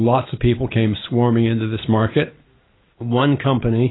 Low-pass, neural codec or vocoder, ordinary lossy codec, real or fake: 7.2 kHz; none; AAC, 16 kbps; real